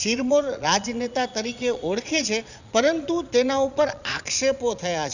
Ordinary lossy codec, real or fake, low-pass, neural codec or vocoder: none; fake; 7.2 kHz; vocoder, 22.05 kHz, 80 mel bands, Vocos